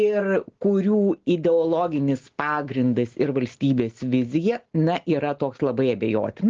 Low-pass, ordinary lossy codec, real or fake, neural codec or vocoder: 7.2 kHz; Opus, 16 kbps; real; none